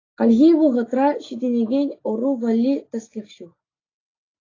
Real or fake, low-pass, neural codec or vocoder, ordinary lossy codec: real; 7.2 kHz; none; AAC, 32 kbps